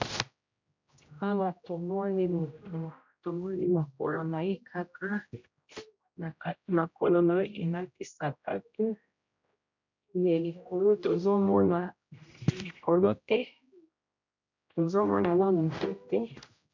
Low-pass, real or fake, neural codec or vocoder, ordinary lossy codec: 7.2 kHz; fake; codec, 16 kHz, 0.5 kbps, X-Codec, HuBERT features, trained on general audio; MP3, 64 kbps